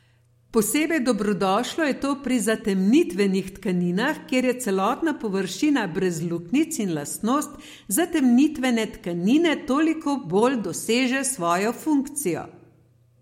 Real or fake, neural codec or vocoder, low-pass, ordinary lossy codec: real; none; 19.8 kHz; MP3, 64 kbps